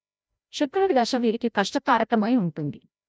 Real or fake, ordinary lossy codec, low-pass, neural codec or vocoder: fake; none; none; codec, 16 kHz, 0.5 kbps, FreqCodec, larger model